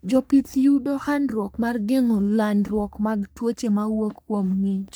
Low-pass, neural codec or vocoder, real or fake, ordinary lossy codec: none; codec, 44.1 kHz, 3.4 kbps, Pupu-Codec; fake; none